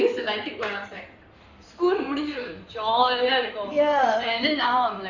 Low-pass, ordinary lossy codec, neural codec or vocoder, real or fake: 7.2 kHz; none; codec, 16 kHz in and 24 kHz out, 2.2 kbps, FireRedTTS-2 codec; fake